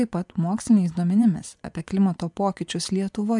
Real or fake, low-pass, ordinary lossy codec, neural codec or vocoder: fake; 10.8 kHz; MP3, 96 kbps; vocoder, 24 kHz, 100 mel bands, Vocos